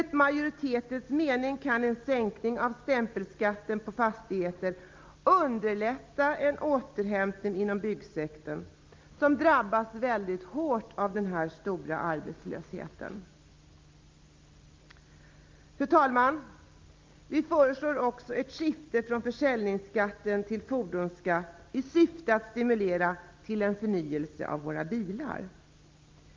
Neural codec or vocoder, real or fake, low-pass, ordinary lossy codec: none; real; 7.2 kHz; Opus, 24 kbps